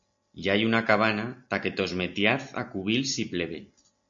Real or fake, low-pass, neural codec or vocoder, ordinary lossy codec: real; 7.2 kHz; none; MP3, 64 kbps